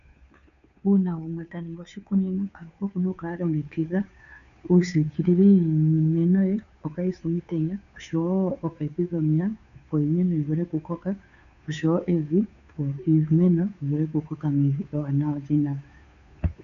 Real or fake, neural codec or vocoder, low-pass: fake; codec, 16 kHz, 2 kbps, FunCodec, trained on Chinese and English, 25 frames a second; 7.2 kHz